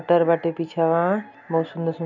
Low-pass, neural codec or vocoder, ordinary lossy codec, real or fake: 7.2 kHz; none; none; real